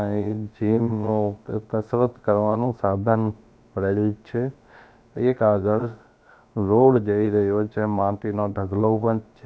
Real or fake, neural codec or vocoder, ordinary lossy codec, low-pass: fake; codec, 16 kHz, about 1 kbps, DyCAST, with the encoder's durations; none; none